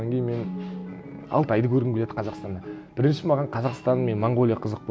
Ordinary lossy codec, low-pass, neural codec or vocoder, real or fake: none; none; none; real